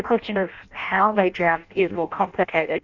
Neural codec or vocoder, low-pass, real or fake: codec, 16 kHz in and 24 kHz out, 0.6 kbps, FireRedTTS-2 codec; 7.2 kHz; fake